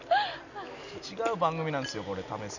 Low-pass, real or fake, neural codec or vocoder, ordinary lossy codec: 7.2 kHz; real; none; none